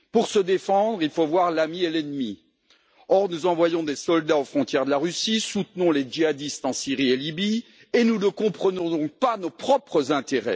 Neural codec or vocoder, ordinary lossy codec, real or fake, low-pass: none; none; real; none